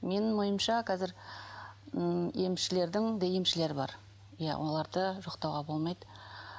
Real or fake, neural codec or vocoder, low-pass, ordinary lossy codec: real; none; none; none